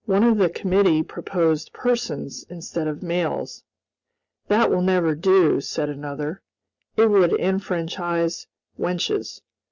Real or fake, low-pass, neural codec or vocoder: real; 7.2 kHz; none